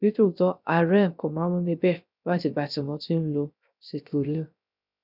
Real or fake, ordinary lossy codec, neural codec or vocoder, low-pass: fake; none; codec, 16 kHz, 0.3 kbps, FocalCodec; 5.4 kHz